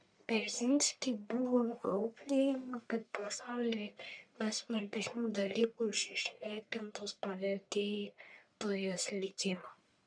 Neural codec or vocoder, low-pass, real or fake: codec, 44.1 kHz, 1.7 kbps, Pupu-Codec; 9.9 kHz; fake